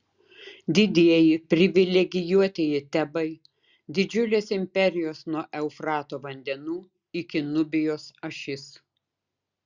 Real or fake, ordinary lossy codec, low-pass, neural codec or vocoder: real; Opus, 64 kbps; 7.2 kHz; none